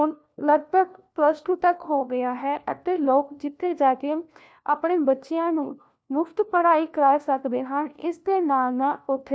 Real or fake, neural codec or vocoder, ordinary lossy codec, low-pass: fake; codec, 16 kHz, 1 kbps, FunCodec, trained on LibriTTS, 50 frames a second; none; none